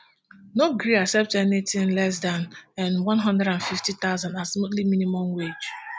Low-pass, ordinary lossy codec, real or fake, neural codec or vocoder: none; none; real; none